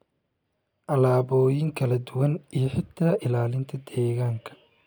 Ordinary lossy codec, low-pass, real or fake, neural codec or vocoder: none; none; real; none